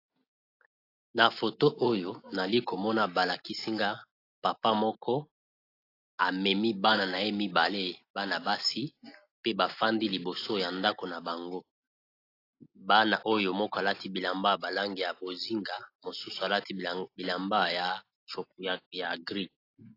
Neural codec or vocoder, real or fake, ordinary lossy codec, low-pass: none; real; AAC, 32 kbps; 5.4 kHz